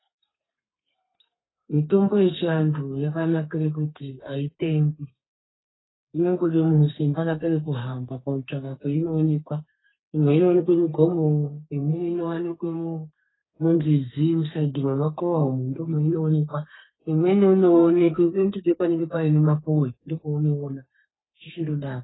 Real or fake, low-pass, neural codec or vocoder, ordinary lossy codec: fake; 7.2 kHz; codec, 32 kHz, 1.9 kbps, SNAC; AAC, 16 kbps